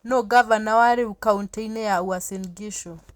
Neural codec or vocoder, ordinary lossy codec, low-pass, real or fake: none; Opus, 64 kbps; 19.8 kHz; real